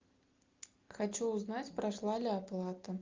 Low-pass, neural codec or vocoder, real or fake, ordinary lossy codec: 7.2 kHz; none; real; Opus, 24 kbps